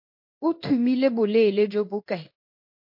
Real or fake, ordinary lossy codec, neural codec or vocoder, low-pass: fake; MP3, 32 kbps; codec, 16 kHz in and 24 kHz out, 1 kbps, XY-Tokenizer; 5.4 kHz